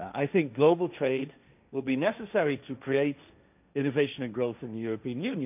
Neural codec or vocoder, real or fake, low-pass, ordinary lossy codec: codec, 16 kHz, 1.1 kbps, Voila-Tokenizer; fake; 3.6 kHz; none